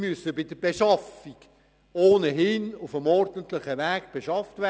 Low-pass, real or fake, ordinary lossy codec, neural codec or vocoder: none; real; none; none